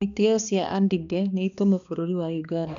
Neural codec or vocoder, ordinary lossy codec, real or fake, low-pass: codec, 16 kHz, 2 kbps, X-Codec, HuBERT features, trained on balanced general audio; none; fake; 7.2 kHz